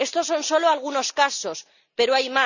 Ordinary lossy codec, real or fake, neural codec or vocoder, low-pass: none; real; none; 7.2 kHz